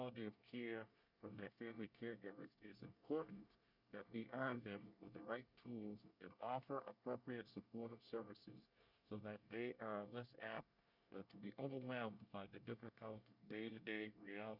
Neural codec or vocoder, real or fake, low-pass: codec, 24 kHz, 1 kbps, SNAC; fake; 5.4 kHz